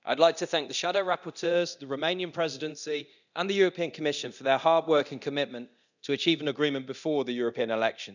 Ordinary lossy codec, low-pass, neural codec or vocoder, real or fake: none; 7.2 kHz; codec, 24 kHz, 0.9 kbps, DualCodec; fake